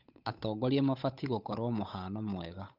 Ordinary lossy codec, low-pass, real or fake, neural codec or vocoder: none; 5.4 kHz; fake; codec, 16 kHz, 8 kbps, FunCodec, trained on Chinese and English, 25 frames a second